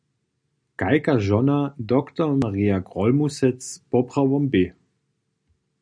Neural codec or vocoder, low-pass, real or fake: none; 9.9 kHz; real